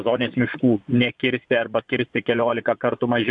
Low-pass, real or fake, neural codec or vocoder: 10.8 kHz; fake; vocoder, 24 kHz, 100 mel bands, Vocos